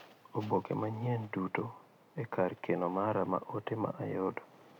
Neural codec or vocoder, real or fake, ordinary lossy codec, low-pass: none; real; none; 19.8 kHz